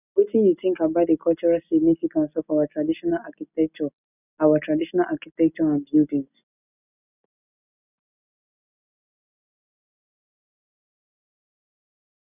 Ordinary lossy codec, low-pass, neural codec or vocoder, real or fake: none; 3.6 kHz; none; real